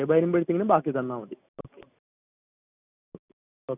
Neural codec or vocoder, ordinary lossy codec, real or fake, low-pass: none; none; real; 3.6 kHz